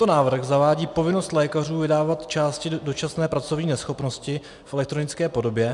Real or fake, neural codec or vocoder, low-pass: real; none; 10.8 kHz